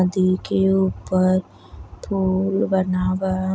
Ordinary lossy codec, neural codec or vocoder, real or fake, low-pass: none; none; real; none